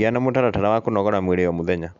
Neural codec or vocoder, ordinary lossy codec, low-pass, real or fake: none; none; 7.2 kHz; real